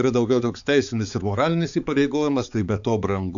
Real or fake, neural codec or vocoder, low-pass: fake; codec, 16 kHz, 4 kbps, X-Codec, HuBERT features, trained on balanced general audio; 7.2 kHz